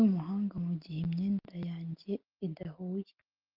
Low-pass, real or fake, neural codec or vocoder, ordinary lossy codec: 5.4 kHz; real; none; Opus, 24 kbps